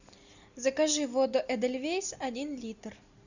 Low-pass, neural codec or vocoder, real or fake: 7.2 kHz; none; real